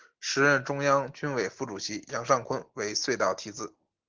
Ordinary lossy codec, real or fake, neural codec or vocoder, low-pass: Opus, 16 kbps; real; none; 7.2 kHz